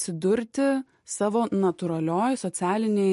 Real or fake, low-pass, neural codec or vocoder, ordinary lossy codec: real; 14.4 kHz; none; MP3, 48 kbps